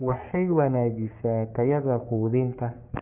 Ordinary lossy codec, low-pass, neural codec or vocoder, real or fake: none; 3.6 kHz; codec, 44.1 kHz, 3.4 kbps, Pupu-Codec; fake